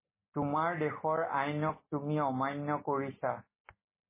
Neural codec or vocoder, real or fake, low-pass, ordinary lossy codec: none; real; 3.6 kHz; MP3, 16 kbps